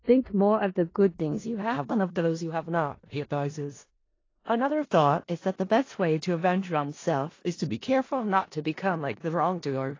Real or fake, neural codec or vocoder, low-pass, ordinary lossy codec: fake; codec, 16 kHz in and 24 kHz out, 0.4 kbps, LongCat-Audio-Codec, four codebook decoder; 7.2 kHz; AAC, 32 kbps